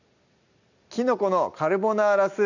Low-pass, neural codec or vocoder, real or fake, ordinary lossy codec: 7.2 kHz; none; real; none